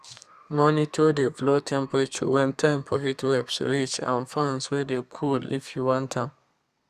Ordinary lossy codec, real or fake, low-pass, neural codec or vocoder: Opus, 64 kbps; fake; 14.4 kHz; codec, 32 kHz, 1.9 kbps, SNAC